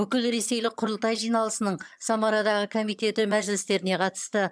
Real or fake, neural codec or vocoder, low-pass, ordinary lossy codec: fake; vocoder, 22.05 kHz, 80 mel bands, HiFi-GAN; none; none